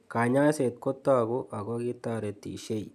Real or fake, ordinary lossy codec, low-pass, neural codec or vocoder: real; none; 14.4 kHz; none